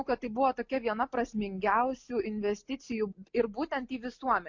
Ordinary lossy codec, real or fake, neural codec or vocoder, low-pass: MP3, 48 kbps; real; none; 7.2 kHz